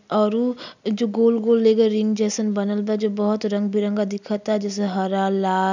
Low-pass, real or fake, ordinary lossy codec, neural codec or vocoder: 7.2 kHz; real; none; none